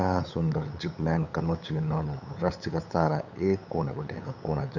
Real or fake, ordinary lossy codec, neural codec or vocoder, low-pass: fake; none; codec, 16 kHz, 8 kbps, FunCodec, trained on LibriTTS, 25 frames a second; 7.2 kHz